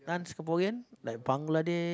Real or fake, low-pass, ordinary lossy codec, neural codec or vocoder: real; none; none; none